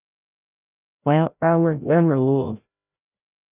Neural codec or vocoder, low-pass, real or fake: codec, 16 kHz, 0.5 kbps, FreqCodec, larger model; 3.6 kHz; fake